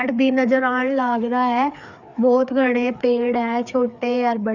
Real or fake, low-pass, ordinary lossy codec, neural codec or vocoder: fake; 7.2 kHz; none; codec, 16 kHz, 4 kbps, FreqCodec, larger model